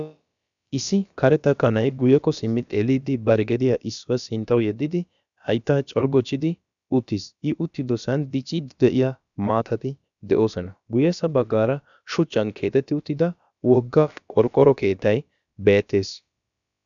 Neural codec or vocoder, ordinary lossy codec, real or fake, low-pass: codec, 16 kHz, about 1 kbps, DyCAST, with the encoder's durations; MP3, 96 kbps; fake; 7.2 kHz